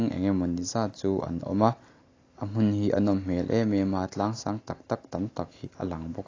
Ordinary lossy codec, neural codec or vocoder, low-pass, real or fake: AAC, 32 kbps; none; 7.2 kHz; real